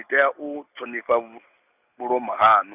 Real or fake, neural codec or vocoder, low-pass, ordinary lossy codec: real; none; 3.6 kHz; none